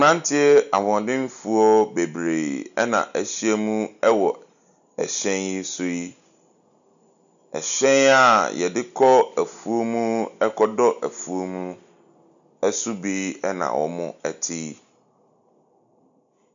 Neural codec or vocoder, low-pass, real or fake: none; 7.2 kHz; real